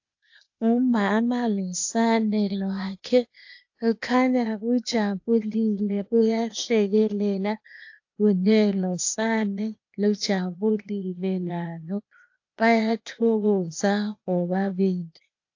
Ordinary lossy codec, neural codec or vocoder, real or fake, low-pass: AAC, 48 kbps; codec, 16 kHz, 0.8 kbps, ZipCodec; fake; 7.2 kHz